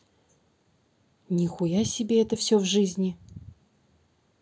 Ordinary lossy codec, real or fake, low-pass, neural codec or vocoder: none; real; none; none